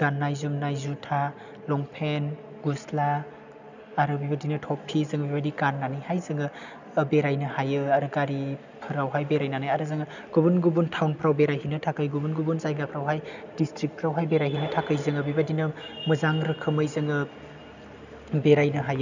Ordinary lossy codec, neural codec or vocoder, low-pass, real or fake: none; none; 7.2 kHz; real